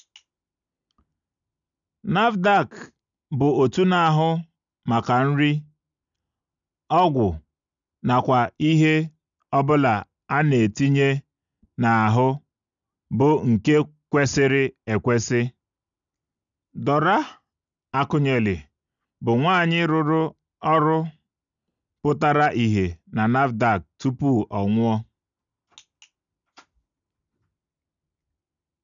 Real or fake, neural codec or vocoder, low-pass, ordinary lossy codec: real; none; 7.2 kHz; none